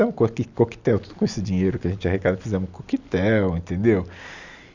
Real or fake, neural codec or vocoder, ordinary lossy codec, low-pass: real; none; none; 7.2 kHz